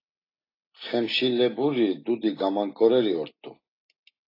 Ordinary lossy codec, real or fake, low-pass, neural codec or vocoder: AAC, 24 kbps; real; 5.4 kHz; none